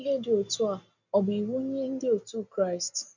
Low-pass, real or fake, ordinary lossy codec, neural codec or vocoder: 7.2 kHz; real; none; none